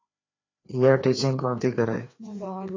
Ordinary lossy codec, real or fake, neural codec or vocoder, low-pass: AAC, 32 kbps; fake; codec, 16 kHz, 2 kbps, FreqCodec, larger model; 7.2 kHz